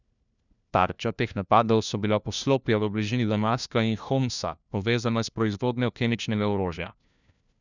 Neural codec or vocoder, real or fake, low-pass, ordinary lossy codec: codec, 16 kHz, 1 kbps, FunCodec, trained on LibriTTS, 50 frames a second; fake; 7.2 kHz; none